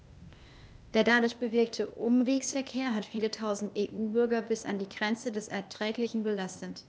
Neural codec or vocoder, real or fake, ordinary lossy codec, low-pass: codec, 16 kHz, 0.8 kbps, ZipCodec; fake; none; none